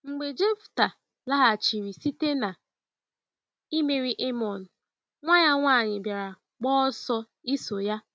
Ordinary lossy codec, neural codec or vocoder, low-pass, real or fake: none; none; none; real